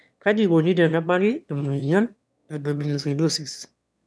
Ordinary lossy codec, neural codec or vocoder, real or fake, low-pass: none; autoencoder, 22.05 kHz, a latent of 192 numbers a frame, VITS, trained on one speaker; fake; none